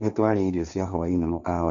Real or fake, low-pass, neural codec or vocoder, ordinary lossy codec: fake; 7.2 kHz; codec, 16 kHz, 1.1 kbps, Voila-Tokenizer; none